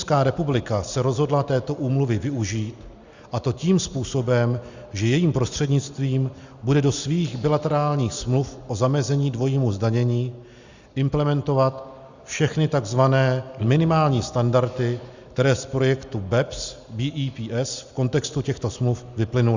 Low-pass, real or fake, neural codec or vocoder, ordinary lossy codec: 7.2 kHz; real; none; Opus, 64 kbps